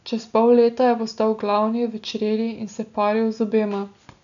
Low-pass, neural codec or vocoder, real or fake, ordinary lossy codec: 7.2 kHz; none; real; none